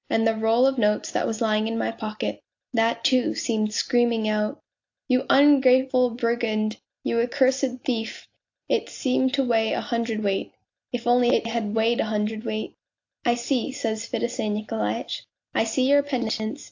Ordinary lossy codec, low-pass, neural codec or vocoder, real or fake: AAC, 48 kbps; 7.2 kHz; none; real